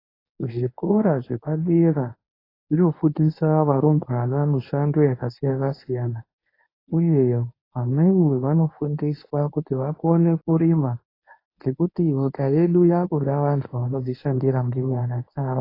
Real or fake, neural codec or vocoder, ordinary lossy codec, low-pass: fake; codec, 24 kHz, 0.9 kbps, WavTokenizer, medium speech release version 1; AAC, 24 kbps; 5.4 kHz